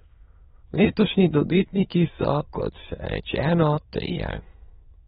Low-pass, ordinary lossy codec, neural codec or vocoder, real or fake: 9.9 kHz; AAC, 16 kbps; autoencoder, 22.05 kHz, a latent of 192 numbers a frame, VITS, trained on many speakers; fake